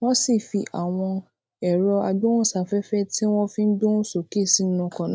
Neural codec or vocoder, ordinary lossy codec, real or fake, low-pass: none; none; real; none